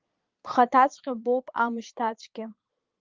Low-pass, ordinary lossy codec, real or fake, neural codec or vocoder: 7.2 kHz; Opus, 32 kbps; fake; vocoder, 22.05 kHz, 80 mel bands, Vocos